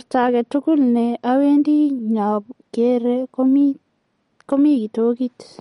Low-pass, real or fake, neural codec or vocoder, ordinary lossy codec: 19.8 kHz; real; none; MP3, 48 kbps